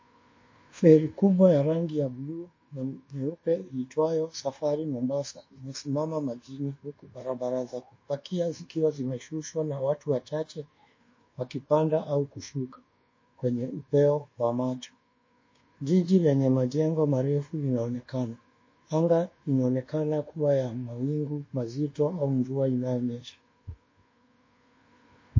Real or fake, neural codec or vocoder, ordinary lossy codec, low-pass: fake; codec, 24 kHz, 1.2 kbps, DualCodec; MP3, 32 kbps; 7.2 kHz